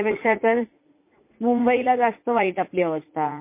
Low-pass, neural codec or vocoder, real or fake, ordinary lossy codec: 3.6 kHz; vocoder, 44.1 kHz, 80 mel bands, Vocos; fake; MP3, 24 kbps